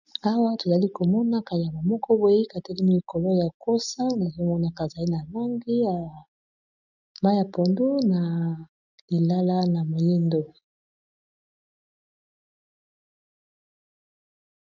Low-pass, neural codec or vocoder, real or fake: 7.2 kHz; none; real